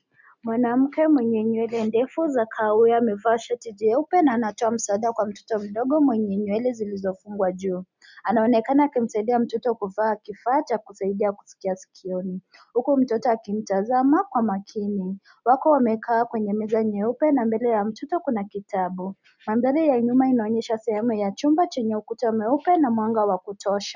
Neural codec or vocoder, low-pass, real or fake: none; 7.2 kHz; real